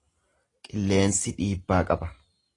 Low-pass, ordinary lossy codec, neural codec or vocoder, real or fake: 10.8 kHz; AAC, 32 kbps; none; real